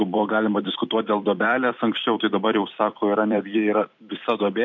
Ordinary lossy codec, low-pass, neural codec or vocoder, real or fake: MP3, 64 kbps; 7.2 kHz; vocoder, 44.1 kHz, 128 mel bands every 256 samples, BigVGAN v2; fake